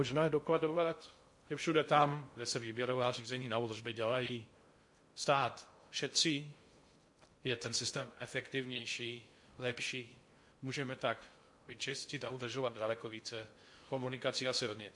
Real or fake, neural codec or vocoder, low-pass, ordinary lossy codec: fake; codec, 16 kHz in and 24 kHz out, 0.6 kbps, FocalCodec, streaming, 2048 codes; 10.8 kHz; MP3, 48 kbps